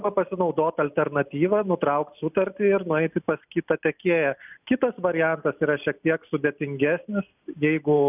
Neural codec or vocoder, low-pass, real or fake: none; 3.6 kHz; real